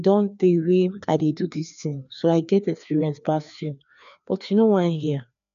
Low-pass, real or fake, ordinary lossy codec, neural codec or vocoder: 7.2 kHz; fake; none; codec, 16 kHz, 2 kbps, FreqCodec, larger model